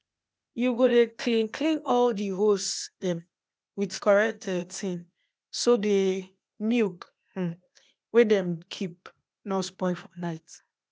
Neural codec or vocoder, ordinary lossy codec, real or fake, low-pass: codec, 16 kHz, 0.8 kbps, ZipCodec; none; fake; none